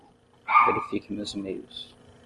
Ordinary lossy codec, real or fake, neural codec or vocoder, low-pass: Opus, 24 kbps; real; none; 10.8 kHz